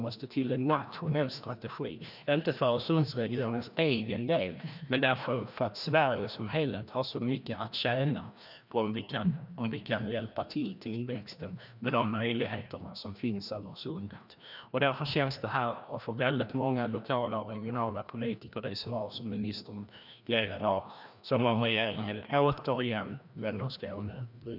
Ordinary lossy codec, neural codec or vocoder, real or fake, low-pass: none; codec, 16 kHz, 1 kbps, FreqCodec, larger model; fake; 5.4 kHz